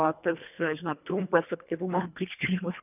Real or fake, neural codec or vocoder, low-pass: fake; codec, 24 kHz, 1.5 kbps, HILCodec; 3.6 kHz